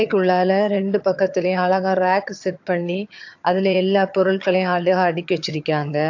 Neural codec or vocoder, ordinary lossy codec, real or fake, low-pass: vocoder, 22.05 kHz, 80 mel bands, HiFi-GAN; AAC, 48 kbps; fake; 7.2 kHz